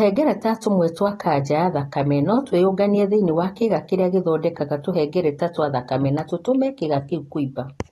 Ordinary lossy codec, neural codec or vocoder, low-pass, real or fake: AAC, 32 kbps; none; 19.8 kHz; real